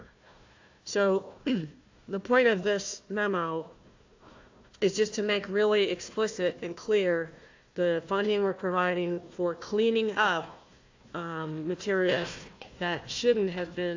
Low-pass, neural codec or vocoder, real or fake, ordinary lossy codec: 7.2 kHz; codec, 16 kHz, 1 kbps, FunCodec, trained on Chinese and English, 50 frames a second; fake; AAC, 48 kbps